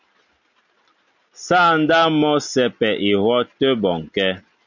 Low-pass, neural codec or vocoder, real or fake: 7.2 kHz; none; real